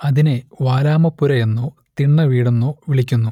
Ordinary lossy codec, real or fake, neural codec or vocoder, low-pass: none; real; none; 19.8 kHz